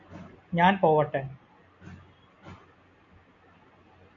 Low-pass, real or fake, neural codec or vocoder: 7.2 kHz; real; none